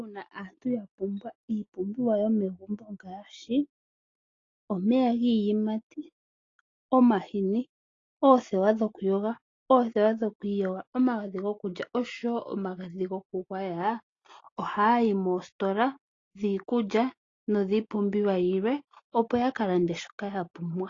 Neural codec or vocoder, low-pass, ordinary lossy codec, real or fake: none; 7.2 kHz; AAC, 32 kbps; real